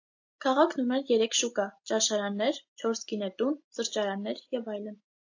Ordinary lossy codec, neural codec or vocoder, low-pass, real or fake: AAC, 48 kbps; none; 7.2 kHz; real